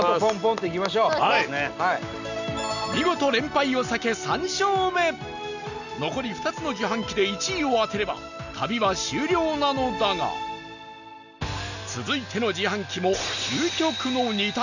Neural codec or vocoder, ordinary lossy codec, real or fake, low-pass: none; none; real; 7.2 kHz